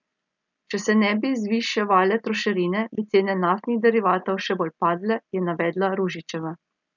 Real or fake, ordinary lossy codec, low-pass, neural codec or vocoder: real; none; 7.2 kHz; none